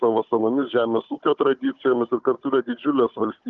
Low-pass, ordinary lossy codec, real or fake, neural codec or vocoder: 7.2 kHz; Opus, 24 kbps; fake; codec, 16 kHz, 16 kbps, FunCodec, trained on Chinese and English, 50 frames a second